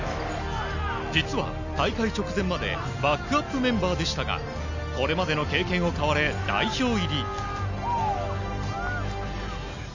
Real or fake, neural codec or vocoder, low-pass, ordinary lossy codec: real; none; 7.2 kHz; none